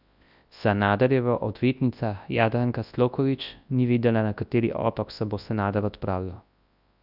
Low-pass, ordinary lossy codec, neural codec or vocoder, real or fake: 5.4 kHz; Opus, 64 kbps; codec, 24 kHz, 0.9 kbps, WavTokenizer, large speech release; fake